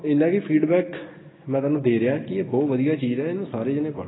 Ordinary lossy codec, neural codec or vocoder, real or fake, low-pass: AAC, 16 kbps; none; real; 7.2 kHz